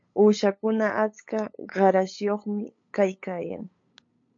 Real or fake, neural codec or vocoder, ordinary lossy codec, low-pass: fake; codec, 16 kHz, 8 kbps, FunCodec, trained on LibriTTS, 25 frames a second; MP3, 48 kbps; 7.2 kHz